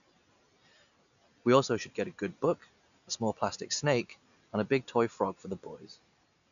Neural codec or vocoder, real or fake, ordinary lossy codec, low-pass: none; real; none; 7.2 kHz